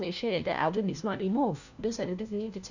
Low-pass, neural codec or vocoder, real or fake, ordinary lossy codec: 7.2 kHz; codec, 16 kHz, 1 kbps, FunCodec, trained on LibriTTS, 50 frames a second; fake; none